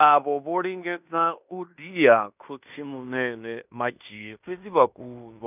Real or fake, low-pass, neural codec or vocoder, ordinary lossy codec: fake; 3.6 kHz; codec, 16 kHz in and 24 kHz out, 0.9 kbps, LongCat-Audio-Codec, fine tuned four codebook decoder; none